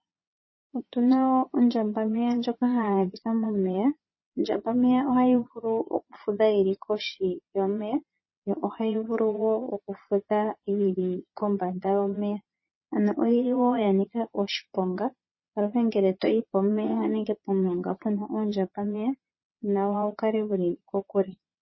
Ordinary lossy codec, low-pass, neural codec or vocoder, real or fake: MP3, 24 kbps; 7.2 kHz; vocoder, 22.05 kHz, 80 mel bands, Vocos; fake